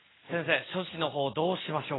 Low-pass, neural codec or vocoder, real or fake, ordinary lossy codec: 7.2 kHz; none; real; AAC, 16 kbps